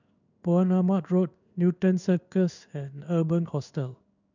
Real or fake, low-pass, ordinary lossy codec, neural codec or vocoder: fake; 7.2 kHz; none; codec, 16 kHz in and 24 kHz out, 1 kbps, XY-Tokenizer